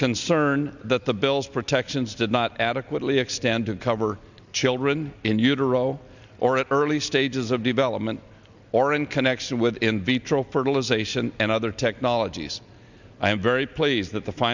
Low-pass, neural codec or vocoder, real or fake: 7.2 kHz; none; real